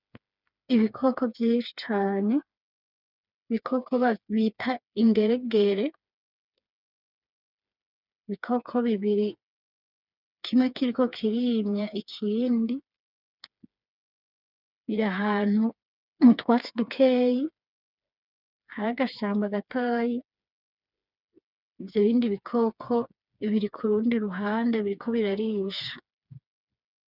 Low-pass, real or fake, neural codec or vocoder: 5.4 kHz; fake; codec, 16 kHz, 4 kbps, FreqCodec, smaller model